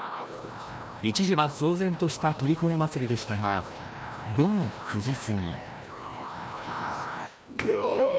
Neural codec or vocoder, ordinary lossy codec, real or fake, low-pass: codec, 16 kHz, 1 kbps, FreqCodec, larger model; none; fake; none